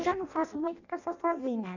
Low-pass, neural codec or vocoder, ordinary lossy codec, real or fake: 7.2 kHz; codec, 16 kHz in and 24 kHz out, 0.6 kbps, FireRedTTS-2 codec; none; fake